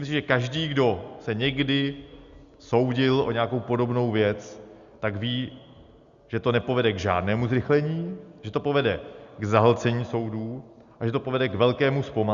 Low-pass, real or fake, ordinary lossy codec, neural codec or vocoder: 7.2 kHz; real; Opus, 64 kbps; none